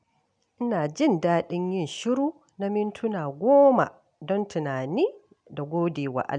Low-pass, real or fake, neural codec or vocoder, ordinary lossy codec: 9.9 kHz; real; none; none